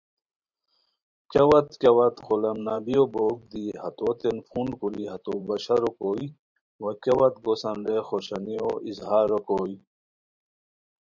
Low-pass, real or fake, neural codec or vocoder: 7.2 kHz; fake; vocoder, 44.1 kHz, 128 mel bands every 512 samples, BigVGAN v2